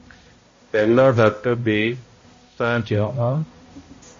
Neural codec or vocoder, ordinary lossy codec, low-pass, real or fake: codec, 16 kHz, 0.5 kbps, X-Codec, HuBERT features, trained on balanced general audio; MP3, 32 kbps; 7.2 kHz; fake